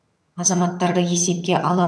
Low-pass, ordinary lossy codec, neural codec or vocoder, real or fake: none; none; vocoder, 22.05 kHz, 80 mel bands, HiFi-GAN; fake